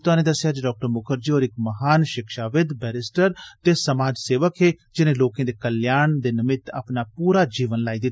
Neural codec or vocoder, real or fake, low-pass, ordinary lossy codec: none; real; none; none